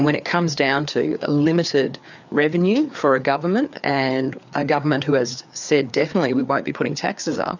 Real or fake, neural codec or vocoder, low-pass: fake; codec, 16 kHz, 4 kbps, FreqCodec, larger model; 7.2 kHz